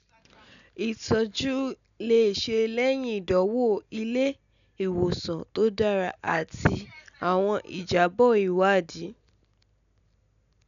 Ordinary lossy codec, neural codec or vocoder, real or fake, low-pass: none; none; real; 7.2 kHz